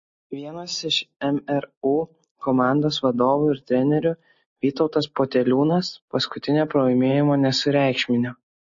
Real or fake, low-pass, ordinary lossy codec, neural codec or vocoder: real; 7.2 kHz; MP3, 32 kbps; none